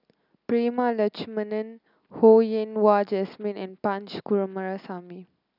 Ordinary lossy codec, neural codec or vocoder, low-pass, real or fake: none; none; 5.4 kHz; real